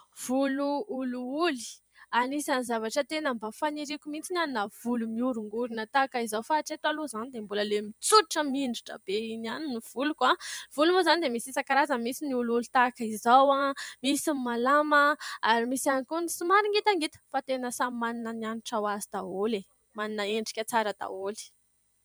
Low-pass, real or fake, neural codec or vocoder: 19.8 kHz; fake; vocoder, 44.1 kHz, 128 mel bands every 512 samples, BigVGAN v2